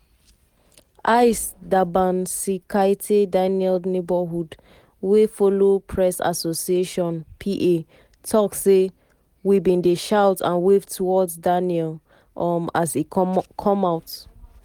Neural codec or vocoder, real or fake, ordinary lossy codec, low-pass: none; real; Opus, 24 kbps; 19.8 kHz